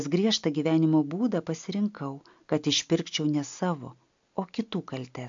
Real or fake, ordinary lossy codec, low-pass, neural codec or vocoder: real; AAC, 64 kbps; 7.2 kHz; none